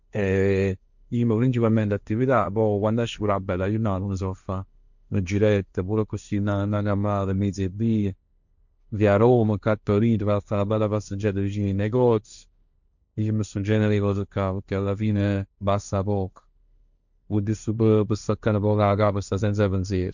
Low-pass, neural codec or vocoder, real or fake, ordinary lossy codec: 7.2 kHz; codec, 16 kHz, 1.1 kbps, Voila-Tokenizer; fake; none